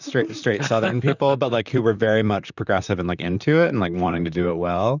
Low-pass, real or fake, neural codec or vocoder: 7.2 kHz; fake; vocoder, 44.1 kHz, 128 mel bands, Pupu-Vocoder